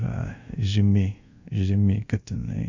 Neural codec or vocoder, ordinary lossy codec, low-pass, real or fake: codec, 24 kHz, 0.5 kbps, DualCodec; AAC, 48 kbps; 7.2 kHz; fake